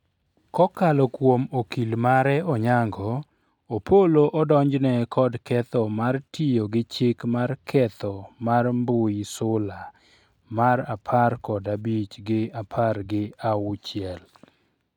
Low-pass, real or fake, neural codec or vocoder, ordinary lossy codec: 19.8 kHz; real; none; none